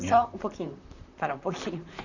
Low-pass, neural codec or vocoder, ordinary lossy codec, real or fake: 7.2 kHz; vocoder, 44.1 kHz, 128 mel bands, Pupu-Vocoder; none; fake